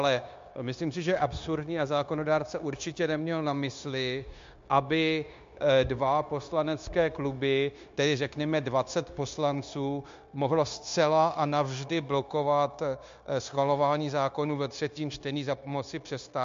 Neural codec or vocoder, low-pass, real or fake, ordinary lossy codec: codec, 16 kHz, 0.9 kbps, LongCat-Audio-Codec; 7.2 kHz; fake; MP3, 48 kbps